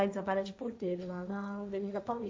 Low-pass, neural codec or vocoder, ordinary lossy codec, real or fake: none; codec, 16 kHz, 1.1 kbps, Voila-Tokenizer; none; fake